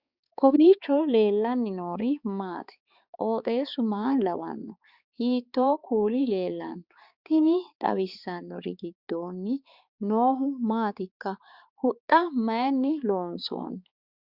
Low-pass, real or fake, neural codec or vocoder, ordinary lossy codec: 5.4 kHz; fake; codec, 16 kHz, 4 kbps, X-Codec, HuBERT features, trained on balanced general audio; Opus, 64 kbps